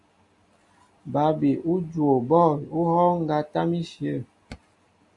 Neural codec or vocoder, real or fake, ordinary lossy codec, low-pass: none; real; AAC, 64 kbps; 10.8 kHz